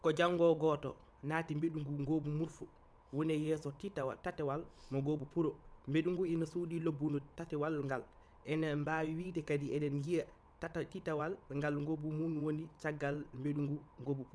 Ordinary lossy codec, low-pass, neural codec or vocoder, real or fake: none; none; vocoder, 22.05 kHz, 80 mel bands, WaveNeXt; fake